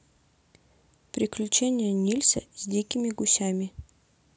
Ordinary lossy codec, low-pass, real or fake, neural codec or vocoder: none; none; real; none